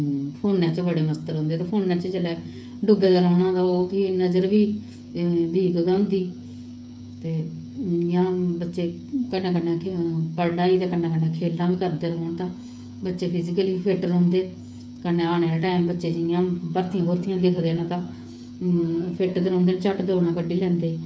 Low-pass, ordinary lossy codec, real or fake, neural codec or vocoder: none; none; fake; codec, 16 kHz, 8 kbps, FreqCodec, smaller model